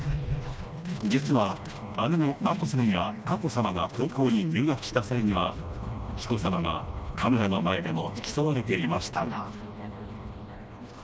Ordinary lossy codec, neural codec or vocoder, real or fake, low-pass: none; codec, 16 kHz, 1 kbps, FreqCodec, smaller model; fake; none